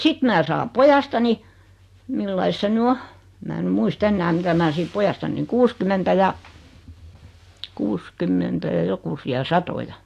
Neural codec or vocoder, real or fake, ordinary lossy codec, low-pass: none; real; AAC, 64 kbps; 14.4 kHz